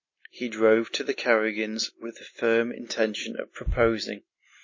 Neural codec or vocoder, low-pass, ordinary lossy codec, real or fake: none; 7.2 kHz; MP3, 32 kbps; real